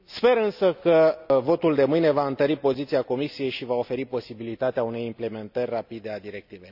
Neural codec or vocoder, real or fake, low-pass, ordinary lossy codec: none; real; 5.4 kHz; none